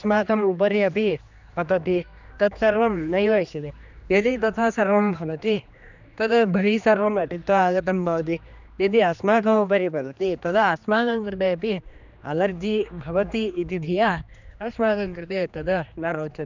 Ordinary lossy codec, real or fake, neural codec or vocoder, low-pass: none; fake; codec, 16 kHz, 2 kbps, X-Codec, HuBERT features, trained on general audio; 7.2 kHz